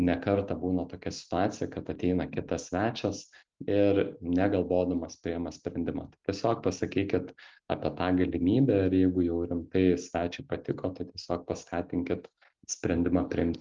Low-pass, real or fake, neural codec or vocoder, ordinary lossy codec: 7.2 kHz; real; none; Opus, 32 kbps